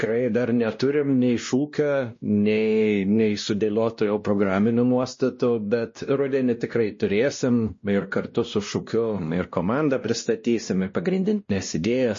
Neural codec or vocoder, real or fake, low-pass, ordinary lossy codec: codec, 16 kHz, 1 kbps, X-Codec, WavLM features, trained on Multilingual LibriSpeech; fake; 7.2 kHz; MP3, 32 kbps